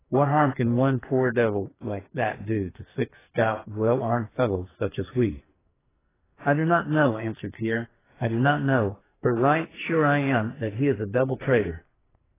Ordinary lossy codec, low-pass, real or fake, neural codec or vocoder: AAC, 16 kbps; 3.6 kHz; fake; codec, 44.1 kHz, 2.6 kbps, SNAC